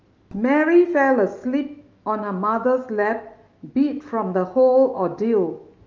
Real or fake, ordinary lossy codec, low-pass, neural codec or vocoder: fake; Opus, 24 kbps; 7.2 kHz; autoencoder, 48 kHz, 128 numbers a frame, DAC-VAE, trained on Japanese speech